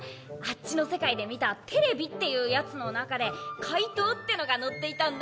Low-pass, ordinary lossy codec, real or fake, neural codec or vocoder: none; none; real; none